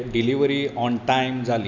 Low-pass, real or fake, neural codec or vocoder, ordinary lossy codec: 7.2 kHz; real; none; none